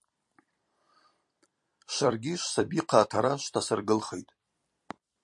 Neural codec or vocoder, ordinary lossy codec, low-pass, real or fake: vocoder, 44.1 kHz, 128 mel bands every 256 samples, BigVGAN v2; MP3, 48 kbps; 10.8 kHz; fake